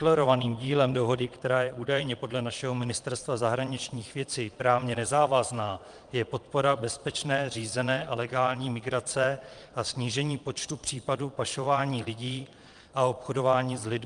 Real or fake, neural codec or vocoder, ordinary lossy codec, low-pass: fake; vocoder, 22.05 kHz, 80 mel bands, Vocos; Opus, 32 kbps; 9.9 kHz